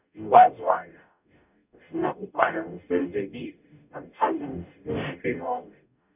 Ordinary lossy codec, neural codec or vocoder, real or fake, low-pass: none; codec, 44.1 kHz, 0.9 kbps, DAC; fake; 3.6 kHz